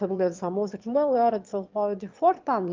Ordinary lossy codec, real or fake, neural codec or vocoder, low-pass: Opus, 24 kbps; fake; autoencoder, 22.05 kHz, a latent of 192 numbers a frame, VITS, trained on one speaker; 7.2 kHz